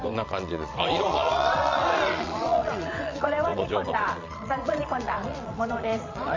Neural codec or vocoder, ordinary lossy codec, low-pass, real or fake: vocoder, 22.05 kHz, 80 mel bands, WaveNeXt; MP3, 48 kbps; 7.2 kHz; fake